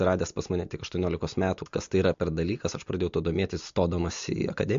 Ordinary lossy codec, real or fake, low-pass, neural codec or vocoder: MP3, 48 kbps; real; 7.2 kHz; none